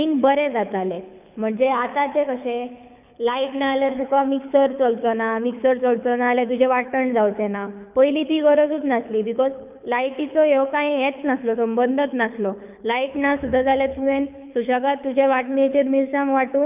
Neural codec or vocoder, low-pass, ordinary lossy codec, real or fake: codec, 24 kHz, 6 kbps, HILCodec; 3.6 kHz; none; fake